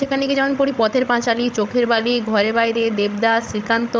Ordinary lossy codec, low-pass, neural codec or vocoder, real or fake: none; none; codec, 16 kHz, 16 kbps, FreqCodec, larger model; fake